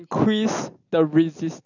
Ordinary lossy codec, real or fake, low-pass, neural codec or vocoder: none; real; 7.2 kHz; none